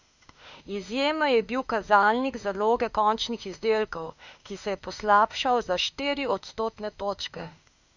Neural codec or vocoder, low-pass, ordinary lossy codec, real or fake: autoencoder, 48 kHz, 32 numbers a frame, DAC-VAE, trained on Japanese speech; 7.2 kHz; none; fake